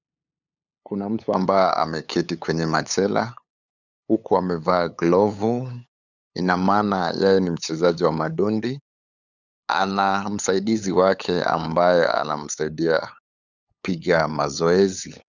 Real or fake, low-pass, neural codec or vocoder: fake; 7.2 kHz; codec, 16 kHz, 8 kbps, FunCodec, trained on LibriTTS, 25 frames a second